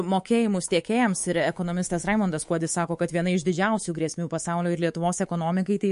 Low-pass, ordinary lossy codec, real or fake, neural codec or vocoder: 10.8 kHz; MP3, 48 kbps; fake; codec, 24 kHz, 3.1 kbps, DualCodec